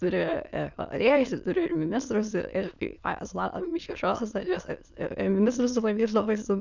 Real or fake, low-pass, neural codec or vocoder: fake; 7.2 kHz; autoencoder, 22.05 kHz, a latent of 192 numbers a frame, VITS, trained on many speakers